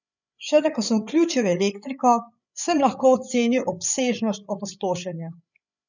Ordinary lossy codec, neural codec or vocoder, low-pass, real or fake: none; codec, 16 kHz, 8 kbps, FreqCodec, larger model; 7.2 kHz; fake